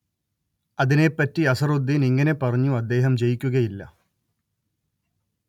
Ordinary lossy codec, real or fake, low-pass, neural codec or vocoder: none; real; 19.8 kHz; none